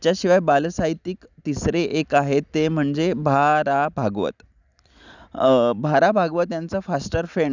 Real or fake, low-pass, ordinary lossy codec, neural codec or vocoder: real; 7.2 kHz; none; none